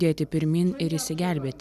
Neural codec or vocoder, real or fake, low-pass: none; real; 14.4 kHz